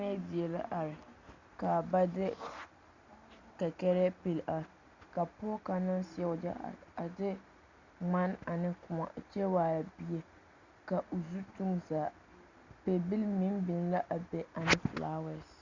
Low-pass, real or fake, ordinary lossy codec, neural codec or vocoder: 7.2 kHz; real; Opus, 64 kbps; none